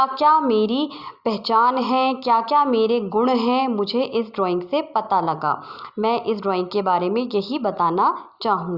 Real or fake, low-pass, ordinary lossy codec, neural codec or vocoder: real; 5.4 kHz; Opus, 64 kbps; none